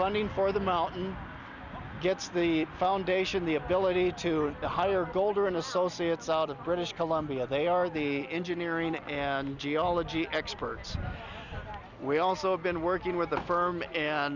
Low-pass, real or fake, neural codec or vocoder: 7.2 kHz; real; none